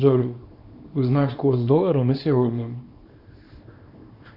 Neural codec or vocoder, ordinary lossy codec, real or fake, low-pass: codec, 24 kHz, 0.9 kbps, WavTokenizer, small release; Opus, 64 kbps; fake; 5.4 kHz